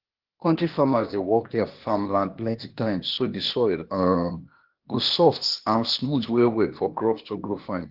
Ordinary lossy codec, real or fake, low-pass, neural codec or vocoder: Opus, 16 kbps; fake; 5.4 kHz; codec, 16 kHz, 0.8 kbps, ZipCodec